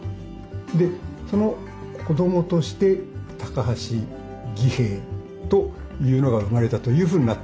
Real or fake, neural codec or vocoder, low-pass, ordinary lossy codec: real; none; none; none